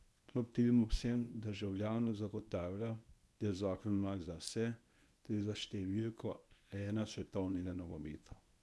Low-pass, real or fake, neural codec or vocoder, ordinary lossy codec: none; fake; codec, 24 kHz, 0.9 kbps, WavTokenizer, medium speech release version 1; none